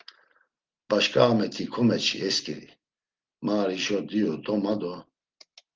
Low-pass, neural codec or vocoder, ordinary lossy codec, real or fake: 7.2 kHz; none; Opus, 16 kbps; real